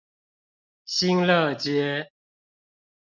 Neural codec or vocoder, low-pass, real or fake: none; 7.2 kHz; real